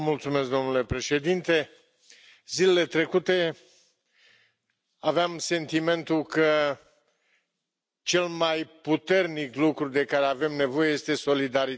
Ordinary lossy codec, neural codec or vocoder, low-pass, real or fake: none; none; none; real